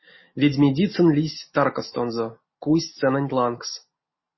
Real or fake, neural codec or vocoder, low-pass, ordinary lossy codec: real; none; 7.2 kHz; MP3, 24 kbps